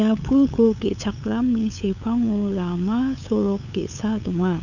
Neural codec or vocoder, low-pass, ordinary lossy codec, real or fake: codec, 16 kHz, 8 kbps, FunCodec, trained on LibriTTS, 25 frames a second; 7.2 kHz; none; fake